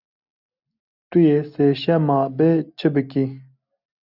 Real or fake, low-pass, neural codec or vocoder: real; 5.4 kHz; none